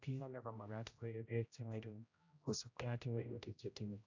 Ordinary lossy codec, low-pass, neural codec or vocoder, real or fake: AAC, 48 kbps; 7.2 kHz; codec, 16 kHz, 0.5 kbps, X-Codec, HuBERT features, trained on general audio; fake